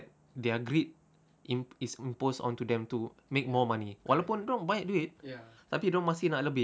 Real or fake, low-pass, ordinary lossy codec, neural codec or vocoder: real; none; none; none